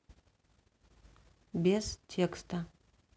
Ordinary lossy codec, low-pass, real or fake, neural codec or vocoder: none; none; real; none